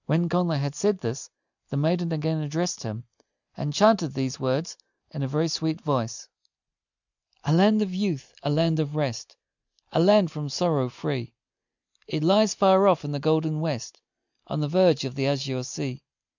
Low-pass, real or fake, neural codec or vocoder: 7.2 kHz; real; none